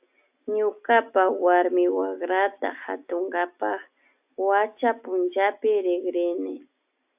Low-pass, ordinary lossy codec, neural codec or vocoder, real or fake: 3.6 kHz; AAC, 32 kbps; none; real